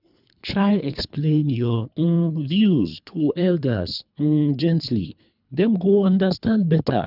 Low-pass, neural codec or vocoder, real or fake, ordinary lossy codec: 5.4 kHz; codec, 24 kHz, 3 kbps, HILCodec; fake; none